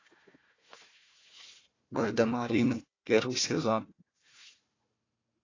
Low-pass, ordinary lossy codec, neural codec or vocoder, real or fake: 7.2 kHz; AAC, 32 kbps; codec, 16 kHz, 1 kbps, FunCodec, trained on Chinese and English, 50 frames a second; fake